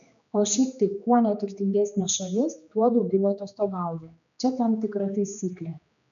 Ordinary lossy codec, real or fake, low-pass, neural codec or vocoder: MP3, 96 kbps; fake; 7.2 kHz; codec, 16 kHz, 2 kbps, X-Codec, HuBERT features, trained on general audio